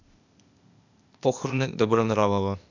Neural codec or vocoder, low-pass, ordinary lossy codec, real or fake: codec, 16 kHz, 0.8 kbps, ZipCodec; 7.2 kHz; none; fake